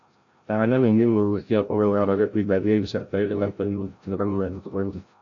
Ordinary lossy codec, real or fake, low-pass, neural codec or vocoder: AAC, 48 kbps; fake; 7.2 kHz; codec, 16 kHz, 0.5 kbps, FreqCodec, larger model